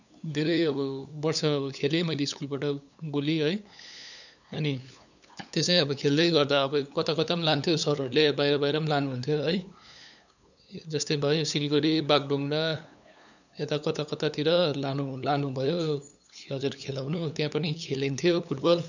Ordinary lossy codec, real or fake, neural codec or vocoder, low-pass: none; fake; codec, 16 kHz, 8 kbps, FunCodec, trained on LibriTTS, 25 frames a second; 7.2 kHz